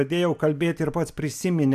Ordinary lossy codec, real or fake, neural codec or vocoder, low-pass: AAC, 96 kbps; real; none; 14.4 kHz